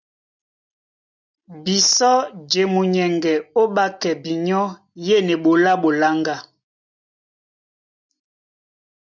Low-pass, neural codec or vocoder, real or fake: 7.2 kHz; none; real